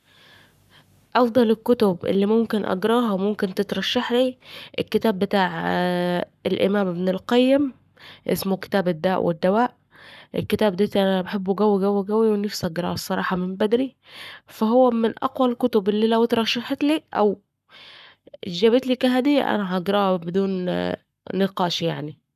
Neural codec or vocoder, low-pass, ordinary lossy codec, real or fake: codec, 44.1 kHz, 7.8 kbps, Pupu-Codec; 14.4 kHz; none; fake